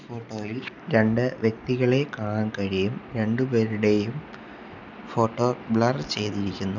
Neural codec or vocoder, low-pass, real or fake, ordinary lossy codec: none; 7.2 kHz; real; none